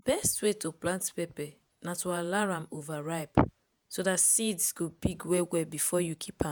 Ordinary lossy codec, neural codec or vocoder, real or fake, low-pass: none; vocoder, 48 kHz, 128 mel bands, Vocos; fake; none